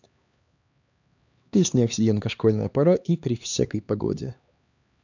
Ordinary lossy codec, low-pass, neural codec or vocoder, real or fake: none; 7.2 kHz; codec, 16 kHz, 2 kbps, X-Codec, HuBERT features, trained on LibriSpeech; fake